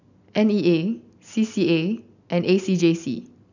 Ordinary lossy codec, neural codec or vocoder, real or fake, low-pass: none; none; real; 7.2 kHz